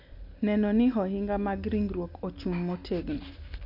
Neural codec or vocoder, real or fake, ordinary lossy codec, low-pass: none; real; AAC, 48 kbps; 5.4 kHz